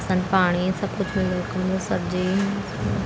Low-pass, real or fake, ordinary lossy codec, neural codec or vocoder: none; real; none; none